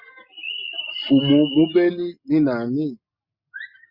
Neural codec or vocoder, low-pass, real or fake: none; 5.4 kHz; real